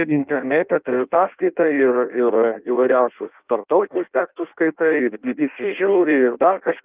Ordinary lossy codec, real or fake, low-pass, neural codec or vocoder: Opus, 24 kbps; fake; 3.6 kHz; codec, 16 kHz in and 24 kHz out, 0.6 kbps, FireRedTTS-2 codec